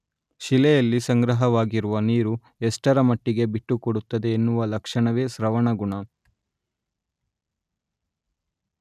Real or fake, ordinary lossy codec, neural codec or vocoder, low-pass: real; none; none; 14.4 kHz